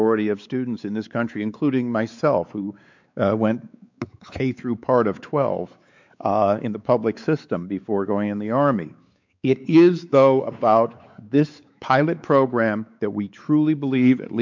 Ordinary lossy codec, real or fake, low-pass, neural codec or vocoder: MP3, 64 kbps; fake; 7.2 kHz; codec, 16 kHz, 4 kbps, X-Codec, WavLM features, trained on Multilingual LibriSpeech